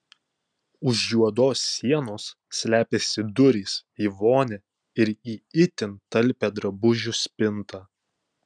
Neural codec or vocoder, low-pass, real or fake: none; 9.9 kHz; real